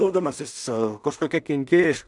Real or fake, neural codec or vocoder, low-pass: fake; codec, 16 kHz in and 24 kHz out, 0.4 kbps, LongCat-Audio-Codec, two codebook decoder; 10.8 kHz